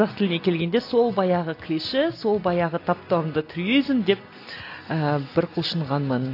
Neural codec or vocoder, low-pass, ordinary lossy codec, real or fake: none; 5.4 kHz; AAC, 32 kbps; real